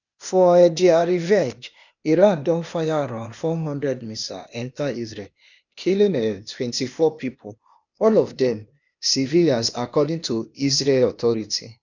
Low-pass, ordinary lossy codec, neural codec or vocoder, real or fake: 7.2 kHz; none; codec, 16 kHz, 0.8 kbps, ZipCodec; fake